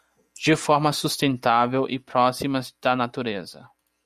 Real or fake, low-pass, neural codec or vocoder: real; 14.4 kHz; none